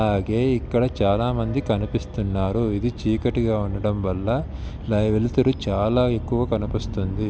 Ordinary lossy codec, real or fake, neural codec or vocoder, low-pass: none; real; none; none